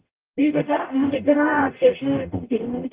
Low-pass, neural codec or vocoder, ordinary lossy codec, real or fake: 3.6 kHz; codec, 44.1 kHz, 0.9 kbps, DAC; Opus, 32 kbps; fake